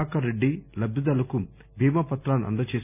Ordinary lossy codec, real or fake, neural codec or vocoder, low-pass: none; real; none; 3.6 kHz